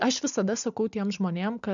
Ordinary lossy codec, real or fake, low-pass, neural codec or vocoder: MP3, 96 kbps; real; 7.2 kHz; none